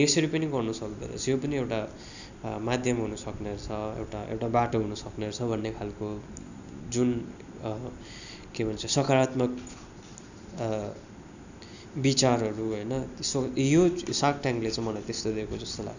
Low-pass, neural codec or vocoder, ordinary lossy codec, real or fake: 7.2 kHz; none; none; real